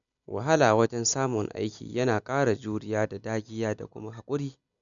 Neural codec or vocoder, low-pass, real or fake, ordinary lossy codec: none; 7.2 kHz; real; none